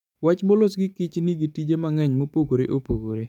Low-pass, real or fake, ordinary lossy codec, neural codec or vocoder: 19.8 kHz; fake; MP3, 96 kbps; codec, 44.1 kHz, 7.8 kbps, DAC